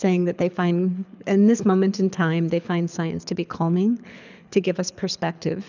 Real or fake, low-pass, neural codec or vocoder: fake; 7.2 kHz; codec, 24 kHz, 6 kbps, HILCodec